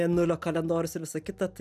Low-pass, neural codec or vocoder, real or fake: 14.4 kHz; none; real